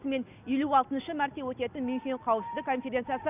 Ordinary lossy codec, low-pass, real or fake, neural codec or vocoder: none; 3.6 kHz; real; none